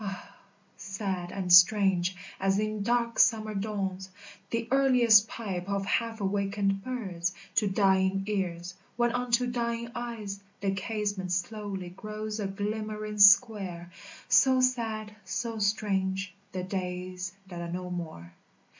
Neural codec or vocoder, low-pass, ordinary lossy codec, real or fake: none; 7.2 kHz; MP3, 48 kbps; real